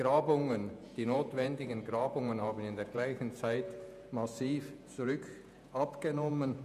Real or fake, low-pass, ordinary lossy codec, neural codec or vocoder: fake; 14.4 kHz; none; vocoder, 48 kHz, 128 mel bands, Vocos